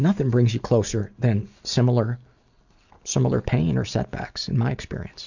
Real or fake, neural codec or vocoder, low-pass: real; none; 7.2 kHz